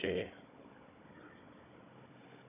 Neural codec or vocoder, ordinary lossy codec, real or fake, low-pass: codec, 16 kHz, 16 kbps, FunCodec, trained on Chinese and English, 50 frames a second; none; fake; 3.6 kHz